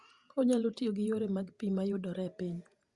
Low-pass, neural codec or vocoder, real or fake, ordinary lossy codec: 10.8 kHz; vocoder, 48 kHz, 128 mel bands, Vocos; fake; Opus, 64 kbps